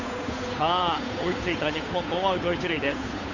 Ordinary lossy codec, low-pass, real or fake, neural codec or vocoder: none; 7.2 kHz; fake; codec, 16 kHz, 8 kbps, FunCodec, trained on Chinese and English, 25 frames a second